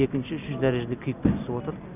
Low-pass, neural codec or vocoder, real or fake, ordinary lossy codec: 3.6 kHz; none; real; none